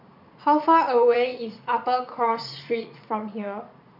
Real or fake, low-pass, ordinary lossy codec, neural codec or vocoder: fake; 5.4 kHz; MP3, 48 kbps; vocoder, 44.1 kHz, 80 mel bands, Vocos